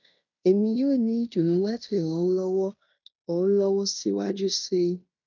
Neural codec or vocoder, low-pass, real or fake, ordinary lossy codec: codec, 16 kHz in and 24 kHz out, 0.9 kbps, LongCat-Audio-Codec, fine tuned four codebook decoder; 7.2 kHz; fake; none